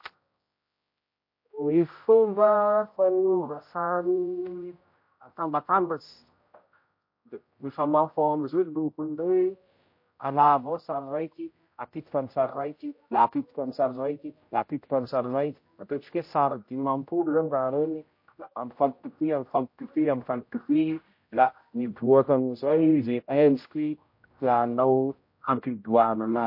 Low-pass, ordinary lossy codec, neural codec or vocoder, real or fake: 5.4 kHz; MP3, 32 kbps; codec, 16 kHz, 0.5 kbps, X-Codec, HuBERT features, trained on general audio; fake